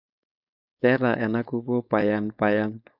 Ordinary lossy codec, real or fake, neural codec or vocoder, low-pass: none; fake; codec, 16 kHz, 4.8 kbps, FACodec; 5.4 kHz